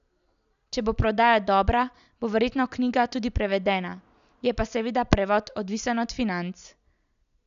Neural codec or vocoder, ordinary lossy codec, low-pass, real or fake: none; none; 7.2 kHz; real